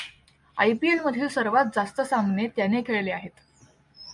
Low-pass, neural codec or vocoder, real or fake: 10.8 kHz; none; real